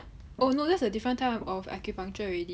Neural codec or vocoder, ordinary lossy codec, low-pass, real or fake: none; none; none; real